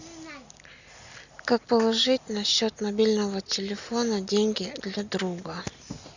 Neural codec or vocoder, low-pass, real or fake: none; 7.2 kHz; real